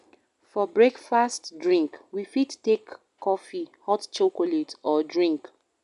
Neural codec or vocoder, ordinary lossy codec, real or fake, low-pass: none; none; real; 10.8 kHz